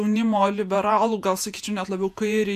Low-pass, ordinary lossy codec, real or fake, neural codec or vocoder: 14.4 kHz; AAC, 96 kbps; fake; vocoder, 48 kHz, 128 mel bands, Vocos